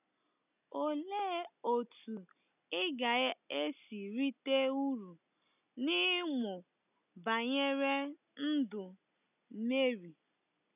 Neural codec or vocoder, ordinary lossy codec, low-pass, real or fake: none; none; 3.6 kHz; real